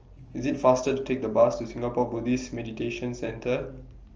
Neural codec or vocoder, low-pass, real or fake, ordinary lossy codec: none; 7.2 kHz; real; Opus, 24 kbps